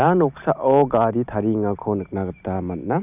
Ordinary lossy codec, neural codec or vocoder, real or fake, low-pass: none; none; real; 3.6 kHz